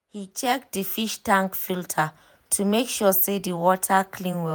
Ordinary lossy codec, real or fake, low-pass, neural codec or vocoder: none; fake; none; vocoder, 48 kHz, 128 mel bands, Vocos